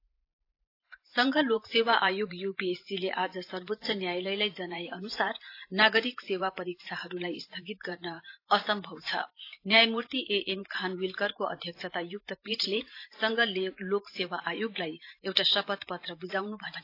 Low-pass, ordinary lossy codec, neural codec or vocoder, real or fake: 5.4 kHz; AAC, 32 kbps; vocoder, 44.1 kHz, 128 mel bands every 512 samples, BigVGAN v2; fake